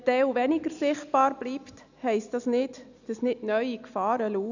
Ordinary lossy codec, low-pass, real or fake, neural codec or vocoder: none; 7.2 kHz; real; none